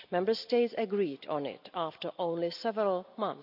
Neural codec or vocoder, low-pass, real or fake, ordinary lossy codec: none; 5.4 kHz; real; AAC, 48 kbps